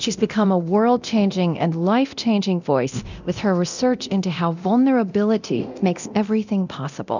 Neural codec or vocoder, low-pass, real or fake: codec, 24 kHz, 0.9 kbps, DualCodec; 7.2 kHz; fake